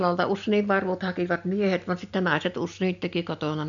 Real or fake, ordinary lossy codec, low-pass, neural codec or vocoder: real; none; 7.2 kHz; none